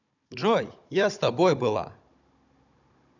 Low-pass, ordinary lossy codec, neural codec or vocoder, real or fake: 7.2 kHz; none; codec, 16 kHz, 16 kbps, FunCodec, trained on Chinese and English, 50 frames a second; fake